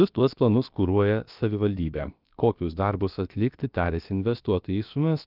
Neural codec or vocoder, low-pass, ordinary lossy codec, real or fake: codec, 16 kHz, about 1 kbps, DyCAST, with the encoder's durations; 5.4 kHz; Opus, 24 kbps; fake